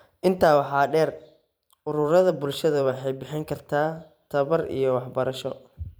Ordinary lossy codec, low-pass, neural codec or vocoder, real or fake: none; none; vocoder, 44.1 kHz, 128 mel bands every 512 samples, BigVGAN v2; fake